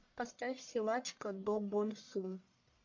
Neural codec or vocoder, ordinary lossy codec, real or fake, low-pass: codec, 44.1 kHz, 1.7 kbps, Pupu-Codec; MP3, 48 kbps; fake; 7.2 kHz